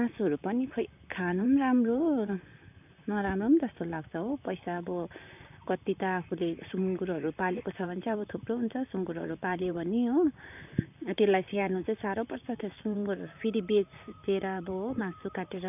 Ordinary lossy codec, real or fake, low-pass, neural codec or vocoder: none; fake; 3.6 kHz; vocoder, 44.1 kHz, 128 mel bands, Pupu-Vocoder